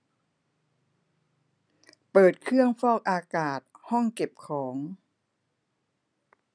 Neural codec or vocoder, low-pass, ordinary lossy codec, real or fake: none; 9.9 kHz; none; real